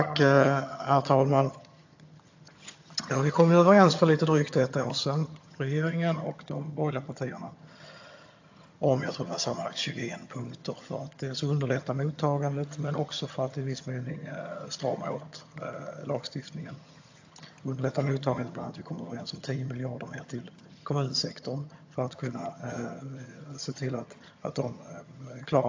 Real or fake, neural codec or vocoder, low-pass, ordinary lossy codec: fake; vocoder, 22.05 kHz, 80 mel bands, HiFi-GAN; 7.2 kHz; AAC, 48 kbps